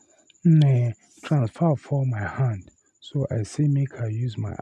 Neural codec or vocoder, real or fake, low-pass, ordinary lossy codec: none; real; none; none